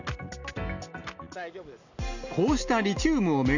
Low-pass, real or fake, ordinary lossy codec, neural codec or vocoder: 7.2 kHz; real; none; none